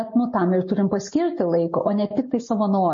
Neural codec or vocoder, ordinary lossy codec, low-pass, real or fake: none; MP3, 32 kbps; 7.2 kHz; real